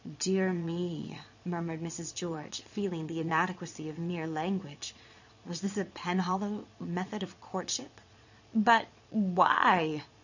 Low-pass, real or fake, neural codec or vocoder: 7.2 kHz; fake; vocoder, 22.05 kHz, 80 mel bands, Vocos